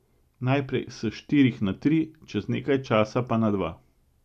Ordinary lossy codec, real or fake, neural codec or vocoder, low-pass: MP3, 96 kbps; real; none; 14.4 kHz